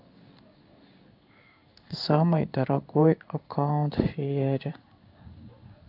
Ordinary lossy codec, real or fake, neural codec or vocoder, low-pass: none; fake; codec, 16 kHz in and 24 kHz out, 1 kbps, XY-Tokenizer; 5.4 kHz